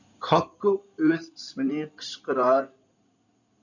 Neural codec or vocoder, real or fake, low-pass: codec, 16 kHz in and 24 kHz out, 2.2 kbps, FireRedTTS-2 codec; fake; 7.2 kHz